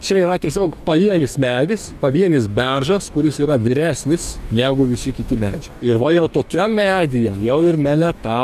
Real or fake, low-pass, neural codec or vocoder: fake; 14.4 kHz; codec, 44.1 kHz, 2.6 kbps, DAC